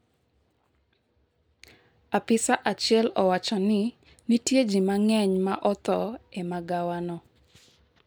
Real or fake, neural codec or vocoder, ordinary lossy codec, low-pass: real; none; none; none